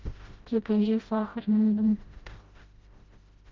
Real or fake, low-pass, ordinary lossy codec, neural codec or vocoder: fake; 7.2 kHz; Opus, 24 kbps; codec, 16 kHz, 0.5 kbps, FreqCodec, smaller model